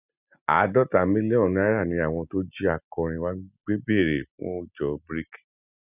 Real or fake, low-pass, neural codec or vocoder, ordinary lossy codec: real; 3.6 kHz; none; none